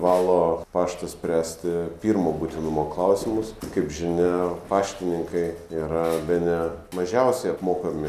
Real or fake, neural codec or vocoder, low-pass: real; none; 14.4 kHz